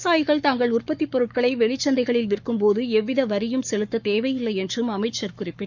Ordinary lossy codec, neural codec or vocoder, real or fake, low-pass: none; codec, 44.1 kHz, 7.8 kbps, Pupu-Codec; fake; 7.2 kHz